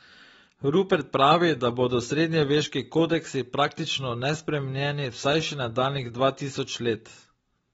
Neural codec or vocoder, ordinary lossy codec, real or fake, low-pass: none; AAC, 24 kbps; real; 19.8 kHz